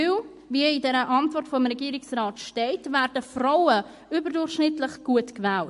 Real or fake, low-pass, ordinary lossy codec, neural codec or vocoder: real; 14.4 kHz; MP3, 48 kbps; none